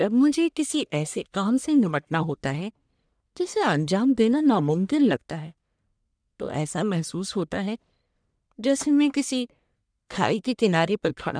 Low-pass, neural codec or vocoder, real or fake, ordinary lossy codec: 9.9 kHz; codec, 44.1 kHz, 1.7 kbps, Pupu-Codec; fake; none